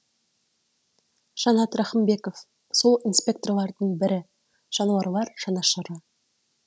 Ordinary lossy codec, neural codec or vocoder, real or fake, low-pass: none; none; real; none